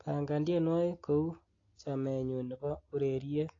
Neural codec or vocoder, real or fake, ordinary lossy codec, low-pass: none; real; AAC, 32 kbps; 7.2 kHz